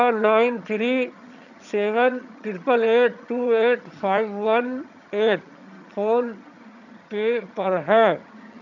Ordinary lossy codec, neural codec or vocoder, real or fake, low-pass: none; vocoder, 22.05 kHz, 80 mel bands, HiFi-GAN; fake; 7.2 kHz